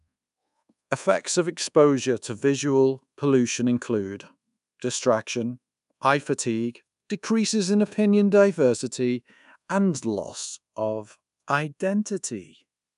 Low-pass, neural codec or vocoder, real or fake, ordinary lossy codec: 10.8 kHz; codec, 24 kHz, 1.2 kbps, DualCodec; fake; none